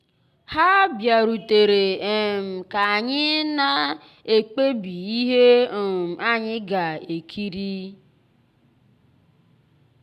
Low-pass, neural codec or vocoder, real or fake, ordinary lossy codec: 14.4 kHz; none; real; none